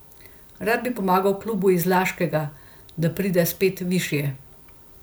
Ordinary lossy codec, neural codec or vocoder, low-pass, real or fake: none; none; none; real